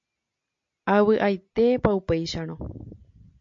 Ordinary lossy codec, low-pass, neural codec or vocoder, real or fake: MP3, 48 kbps; 7.2 kHz; none; real